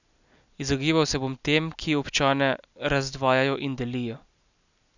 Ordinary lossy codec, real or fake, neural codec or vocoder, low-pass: none; real; none; 7.2 kHz